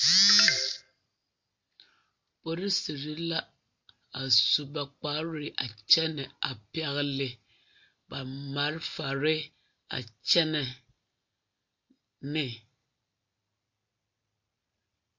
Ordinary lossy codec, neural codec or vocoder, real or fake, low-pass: MP3, 48 kbps; none; real; 7.2 kHz